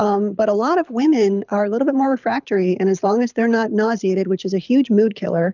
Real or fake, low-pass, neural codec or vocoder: fake; 7.2 kHz; codec, 24 kHz, 6 kbps, HILCodec